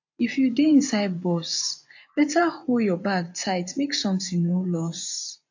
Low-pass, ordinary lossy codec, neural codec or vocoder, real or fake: 7.2 kHz; AAC, 48 kbps; vocoder, 24 kHz, 100 mel bands, Vocos; fake